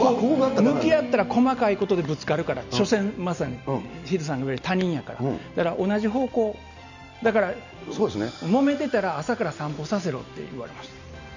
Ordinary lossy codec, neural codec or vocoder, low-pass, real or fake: none; none; 7.2 kHz; real